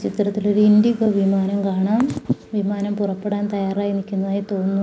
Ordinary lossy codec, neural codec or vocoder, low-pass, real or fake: none; none; none; real